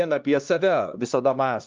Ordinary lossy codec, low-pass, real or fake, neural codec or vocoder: Opus, 24 kbps; 7.2 kHz; fake; codec, 16 kHz, 1 kbps, X-Codec, HuBERT features, trained on LibriSpeech